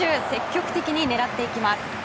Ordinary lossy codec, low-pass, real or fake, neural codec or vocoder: none; none; real; none